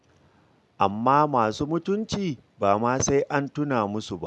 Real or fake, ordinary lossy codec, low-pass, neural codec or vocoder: real; none; none; none